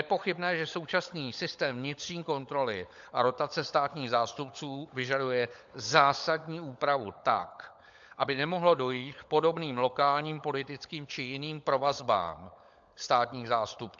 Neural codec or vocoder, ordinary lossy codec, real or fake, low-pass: codec, 16 kHz, 16 kbps, FunCodec, trained on LibriTTS, 50 frames a second; AAC, 64 kbps; fake; 7.2 kHz